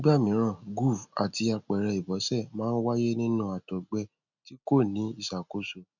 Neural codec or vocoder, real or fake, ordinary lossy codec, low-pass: none; real; none; 7.2 kHz